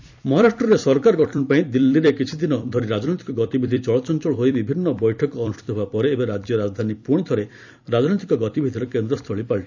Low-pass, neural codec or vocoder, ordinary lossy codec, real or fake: 7.2 kHz; vocoder, 44.1 kHz, 128 mel bands every 256 samples, BigVGAN v2; none; fake